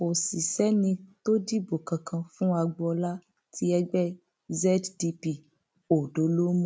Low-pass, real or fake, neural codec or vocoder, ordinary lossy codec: none; real; none; none